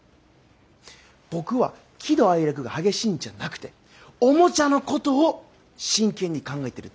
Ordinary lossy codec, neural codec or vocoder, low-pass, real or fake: none; none; none; real